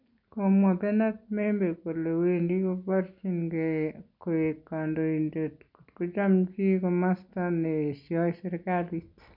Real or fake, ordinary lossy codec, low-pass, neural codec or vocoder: real; none; 5.4 kHz; none